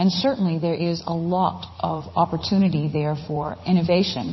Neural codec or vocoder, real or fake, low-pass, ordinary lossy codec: vocoder, 44.1 kHz, 80 mel bands, Vocos; fake; 7.2 kHz; MP3, 24 kbps